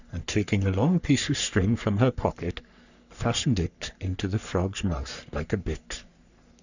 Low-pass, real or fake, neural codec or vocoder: 7.2 kHz; fake; codec, 44.1 kHz, 3.4 kbps, Pupu-Codec